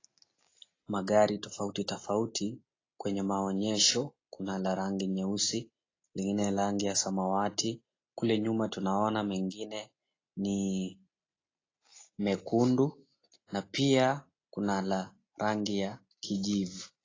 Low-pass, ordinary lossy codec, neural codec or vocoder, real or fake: 7.2 kHz; AAC, 32 kbps; none; real